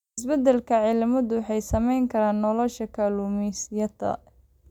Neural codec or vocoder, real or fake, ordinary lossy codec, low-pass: none; real; none; 19.8 kHz